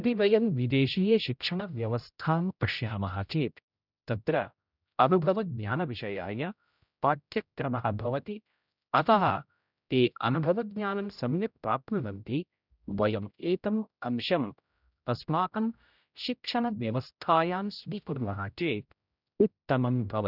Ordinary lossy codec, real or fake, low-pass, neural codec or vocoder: none; fake; 5.4 kHz; codec, 16 kHz, 0.5 kbps, X-Codec, HuBERT features, trained on general audio